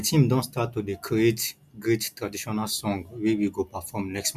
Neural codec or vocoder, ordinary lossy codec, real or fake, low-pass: none; none; real; 14.4 kHz